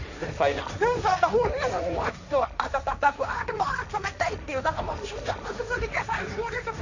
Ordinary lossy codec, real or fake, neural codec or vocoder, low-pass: none; fake; codec, 16 kHz, 1.1 kbps, Voila-Tokenizer; 7.2 kHz